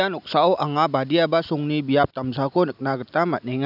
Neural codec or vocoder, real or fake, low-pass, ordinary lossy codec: none; real; 5.4 kHz; none